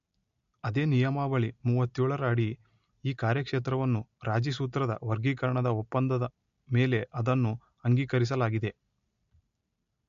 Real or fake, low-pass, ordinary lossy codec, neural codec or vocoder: real; 7.2 kHz; MP3, 48 kbps; none